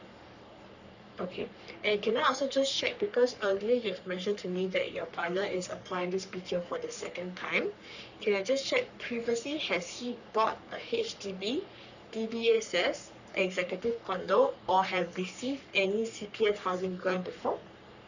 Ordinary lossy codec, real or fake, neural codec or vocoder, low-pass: none; fake; codec, 44.1 kHz, 3.4 kbps, Pupu-Codec; 7.2 kHz